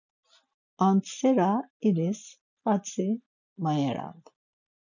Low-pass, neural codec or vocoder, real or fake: 7.2 kHz; none; real